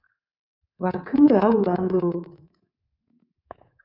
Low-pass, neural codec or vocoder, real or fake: 5.4 kHz; codec, 24 kHz, 3.1 kbps, DualCodec; fake